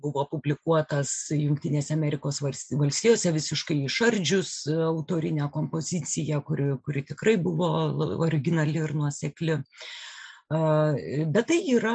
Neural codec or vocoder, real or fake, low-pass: none; real; 9.9 kHz